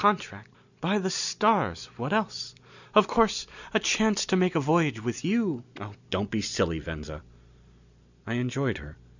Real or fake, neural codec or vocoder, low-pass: real; none; 7.2 kHz